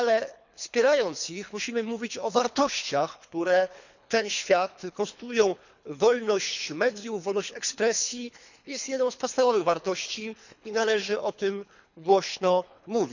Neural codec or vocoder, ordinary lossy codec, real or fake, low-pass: codec, 24 kHz, 3 kbps, HILCodec; none; fake; 7.2 kHz